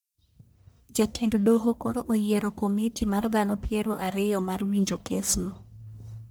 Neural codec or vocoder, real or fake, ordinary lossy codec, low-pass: codec, 44.1 kHz, 1.7 kbps, Pupu-Codec; fake; none; none